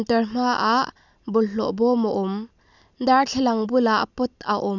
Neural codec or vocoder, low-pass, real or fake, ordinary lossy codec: none; 7.2 kHz; real; none